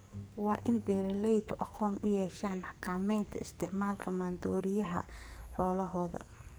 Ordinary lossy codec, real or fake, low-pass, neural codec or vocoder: none; fake; none; codec, 44.1 kHz, 2.6 kbps, SNAC